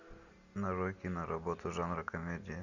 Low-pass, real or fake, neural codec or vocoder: 7.2 kHz; real; none